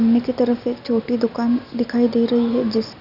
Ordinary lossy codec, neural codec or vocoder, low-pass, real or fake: none; none; 5.4 kHz; real